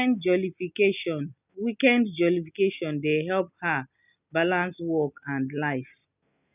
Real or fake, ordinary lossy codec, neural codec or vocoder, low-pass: real; none; none; 3.6 kHz